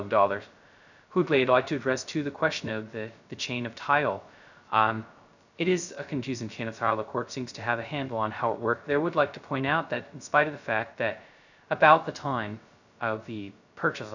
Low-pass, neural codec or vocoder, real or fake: 7.2 kHz; codec, 16 kHz, 0.2 kbps, FocalCodec; fake